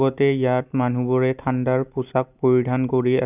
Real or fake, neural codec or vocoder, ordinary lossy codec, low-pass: real; none; none; 3.6 kHz